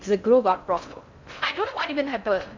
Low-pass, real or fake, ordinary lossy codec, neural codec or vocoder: 7.2 kHz; fake; MP3, 64 kbps; codec, 16 kHz in and 24 kHz out, 0.6 kbps, FocalCodec, streaming, 4096 codes